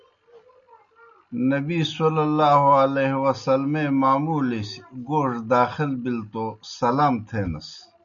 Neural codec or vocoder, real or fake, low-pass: none; real; 7.2 kHz